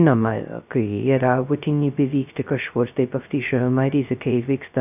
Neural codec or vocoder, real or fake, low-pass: codec, 16 kHz, 0.2 kbps, FocalCodec; fake; 3.6 kHz